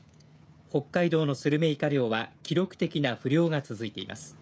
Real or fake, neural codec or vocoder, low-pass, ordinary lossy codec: fake; codec, 16 kHz, 16 kbps, FreqCodec, smaller model; none; none